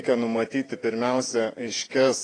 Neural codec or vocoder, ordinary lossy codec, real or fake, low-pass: vocoder, 48 kHz, 128 mel bands, Vocos; AAC, 32 kbps; fake; 9.9 kHz